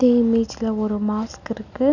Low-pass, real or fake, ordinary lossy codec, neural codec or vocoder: 7.2 kHz; real; none; none